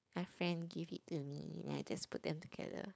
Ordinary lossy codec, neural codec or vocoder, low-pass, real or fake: none; codec, 16 kHz, 4.8 kbps, FACodec; none; fake